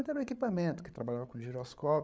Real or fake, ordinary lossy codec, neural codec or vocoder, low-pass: fake; none; codec, 16 kHz, 8 kbps, FreqCodec, larger model; none